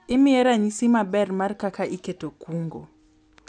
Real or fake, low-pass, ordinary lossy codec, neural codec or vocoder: real; 9.9 kHz; none; none